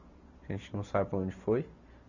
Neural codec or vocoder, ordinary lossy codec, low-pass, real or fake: none; none; 7.2 kHz; real